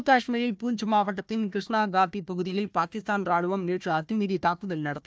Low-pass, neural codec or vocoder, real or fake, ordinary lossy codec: none; codec, 16 kHz, 1 kbps, FunCodec, trained on Chinese and English, 50 frames a second; fake; none